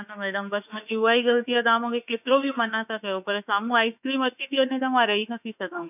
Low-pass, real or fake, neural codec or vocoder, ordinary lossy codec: 3.6 kHz; fake; autoencoder, 48 kHz, 32 numbers a frame, DAC-VAE, trained on Japanese speech; none